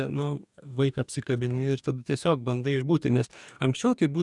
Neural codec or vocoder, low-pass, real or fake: codec, 44.1 kHz, 2.6 kbps, DAC; 10.8 kHz; fake